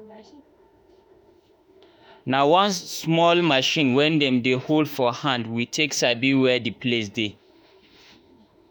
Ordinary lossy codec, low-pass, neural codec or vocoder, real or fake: none; none; autoencoder, 48 kHz, 32 numbers a frame, DAC-VAE, trained on Japanese speech; fake